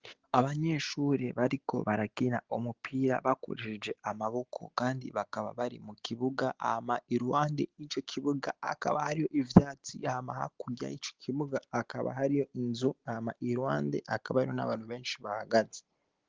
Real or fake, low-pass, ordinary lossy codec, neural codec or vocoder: real; 7.2 kHz; Opus, 16 kbps; none